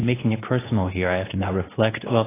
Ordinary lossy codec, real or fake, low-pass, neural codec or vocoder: AAC, 16 kbps; fake; 3.6 kHz; codec, 24 kHz, 0.9 kbps, WavTokenizer, medium speech release version 2